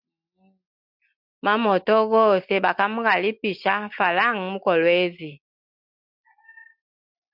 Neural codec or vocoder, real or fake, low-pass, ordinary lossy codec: none; real; 5.4 kHz; MP3, 48 kbps